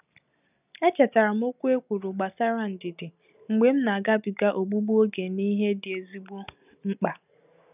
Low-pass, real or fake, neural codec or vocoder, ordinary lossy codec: 3.6 kHz; real; none; none